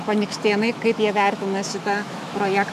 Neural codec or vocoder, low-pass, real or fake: autoencoder, 48 kHz, 128 numbers a frame, DAC-VAE, trained on Japanese speech; 14.4 kHz; fake